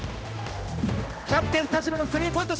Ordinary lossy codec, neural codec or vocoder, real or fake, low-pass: none; codec, 16 kHz, 1 kbps, X-Codec, HuBERT features, trained on general audio; fake; none